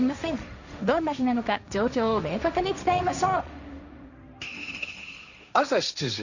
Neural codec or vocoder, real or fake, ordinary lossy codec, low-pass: codec, 16 kHz, 1.1 kbps, Voila-Tokenizer; fake; none; 7.2 kHz